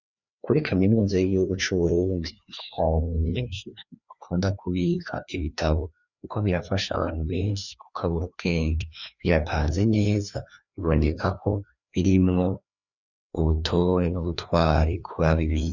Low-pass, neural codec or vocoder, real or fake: 7.2 kHz; codec, 16 kHz, 2 kbps, FreqCodec, larger model; fake